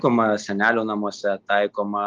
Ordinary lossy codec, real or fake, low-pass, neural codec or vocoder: Opus, 24 kbps; real; 7.2 kHz; none